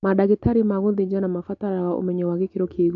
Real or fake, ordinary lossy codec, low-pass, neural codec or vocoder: real; none; 7.2 kHz; none